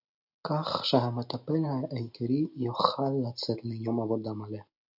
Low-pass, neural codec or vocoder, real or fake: 5.4 kHz; none; real